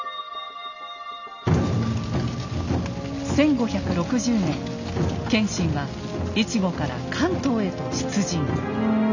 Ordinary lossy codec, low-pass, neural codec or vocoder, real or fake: none; 7.2 kHz; none; real